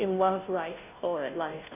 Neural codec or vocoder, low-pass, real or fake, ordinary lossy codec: codec, 16 kHz, 0.5 kbps, FunCodec, trained on Chinese and English, 25 frames a second; 3.6 kHz; fake; none